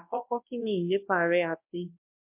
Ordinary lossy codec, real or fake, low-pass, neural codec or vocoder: MP3, 32 kbps; fake; 3.6 kHz; codec, 24 kHz, 0.9 kbps, WavTokenizer, large speech release